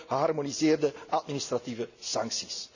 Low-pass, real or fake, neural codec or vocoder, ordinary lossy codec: 7.2 kHz; real; none; none